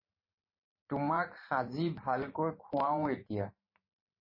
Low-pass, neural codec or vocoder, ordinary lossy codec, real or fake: 5.4 kHz; vocoder, 44.1 kHz, 128 mel bands every 512 samples, BigVGAN v2; MP3, 24 kbps; fake